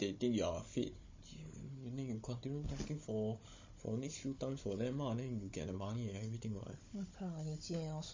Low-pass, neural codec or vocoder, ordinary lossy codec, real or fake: 7.2 kHz; codec, 16 kHz, 16 kbps, FunCodec, trained on Chinese and English, 50 frames a second; MP3, 32 kbps; fake